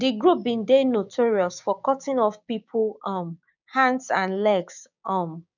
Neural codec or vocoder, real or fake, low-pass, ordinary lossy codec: codec, 16 kHz, 6 kbps, DAC; fake; 7.2 kHz; none